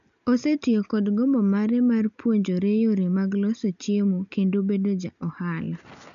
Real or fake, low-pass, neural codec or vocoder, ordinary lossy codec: real; 7.2 kHz; none; none